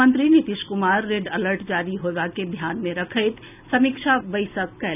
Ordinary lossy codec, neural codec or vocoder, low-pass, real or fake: none; none; 3.6 kHz; real